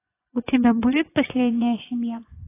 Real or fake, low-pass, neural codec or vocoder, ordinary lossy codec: fake; 3.6 kHz; vocoder, 24 kHz, 100 mel bands, Vocos; AAC, 24 kbps